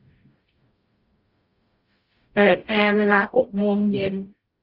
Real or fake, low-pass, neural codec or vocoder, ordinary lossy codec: fake; 5.4 kHz; codec, 44.1 kHz, 0.9 kbps, DAC; Opus, 32 kbps